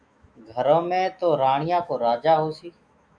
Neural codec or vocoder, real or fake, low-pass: autoencoder, 48 kHz, 128 numbers a frame, DAC-VAE, trained on Japanese speech; fake; 9.9 kHz